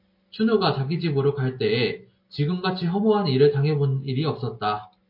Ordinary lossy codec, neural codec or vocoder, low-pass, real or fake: MP3, 32 kbps; none; 5.4 kHz; real